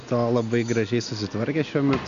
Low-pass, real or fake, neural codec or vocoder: 7.2 kHz; real; none